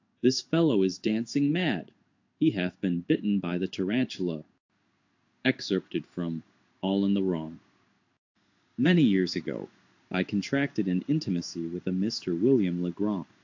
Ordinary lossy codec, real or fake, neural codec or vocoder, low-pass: AAC, 48 kbps; fake; codec, 16 kHz in and 24 kHz out, 1 kbps, XY-Tokenizer; 7.2 kHz